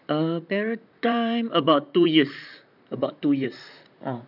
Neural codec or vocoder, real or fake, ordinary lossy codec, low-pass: vocoder, 44.1 kHz, 128 mel bands, Pupu-Vocoder; fake; none; 5.4 kHz